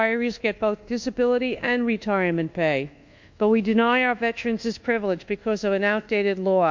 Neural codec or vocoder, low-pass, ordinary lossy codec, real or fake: codec, 24 kHz, 1.2 kbps, DualCodec; 7.2 kHz; MP3, 48 kbps; fake